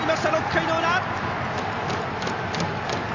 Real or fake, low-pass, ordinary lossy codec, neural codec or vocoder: real; 7.2 kHz; none; none